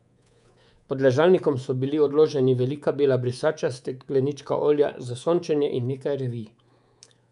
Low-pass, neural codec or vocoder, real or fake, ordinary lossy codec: 10.8 kHz; codec, 24 kHz, 3.1 kbps, DualCodec; fake; none